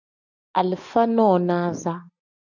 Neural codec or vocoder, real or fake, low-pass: none; real; 7.2 kHz